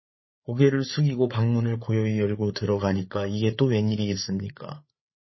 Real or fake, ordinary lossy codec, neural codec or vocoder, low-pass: fake; MP3, 24 kbps; codec, 16 kHz, 8 kbps, FreqCodec, larger model; 7.2 kHz